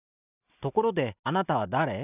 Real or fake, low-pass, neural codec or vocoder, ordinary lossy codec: real; 3.6 kHz; none; none